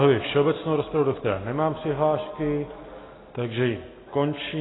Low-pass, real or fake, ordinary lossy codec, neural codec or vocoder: 7.2 kHz; real; AAC, 16 kbps; none